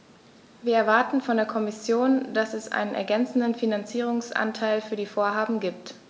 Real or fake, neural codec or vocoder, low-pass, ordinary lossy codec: real; none; none; none